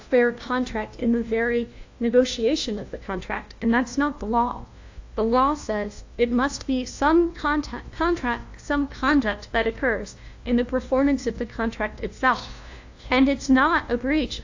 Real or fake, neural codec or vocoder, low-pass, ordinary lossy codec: fake; codec, 16 kHz, 1 kbps, FunCodec, trained on LibriTTS, 50 frames a second; 7.2 kHz; AAC, 48 kbps